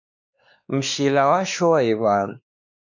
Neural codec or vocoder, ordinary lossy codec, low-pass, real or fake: codec, 16 kHz, 2 kbps, X-Codec, WavLM features, trained on Multilingual LibriSpeech; MP3, 64 kbps; 7.2 kHz; fake